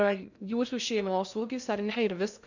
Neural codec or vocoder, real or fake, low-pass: codec, 16 kHz in and 24 kHz out, 0.6 kbps, FocalCodec, streaming, 2048 codes; fake; 7.2 kHz